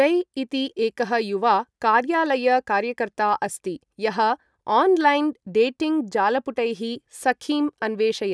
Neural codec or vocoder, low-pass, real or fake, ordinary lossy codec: none; none; real; none